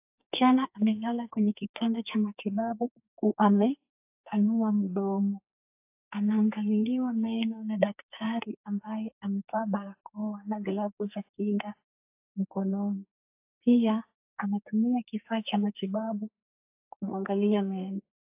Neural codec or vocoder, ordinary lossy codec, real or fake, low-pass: codec, 44.1 kHz, 2.6 kbps, SNAC; AAC, 32 kbps; fake; 3.6 kHz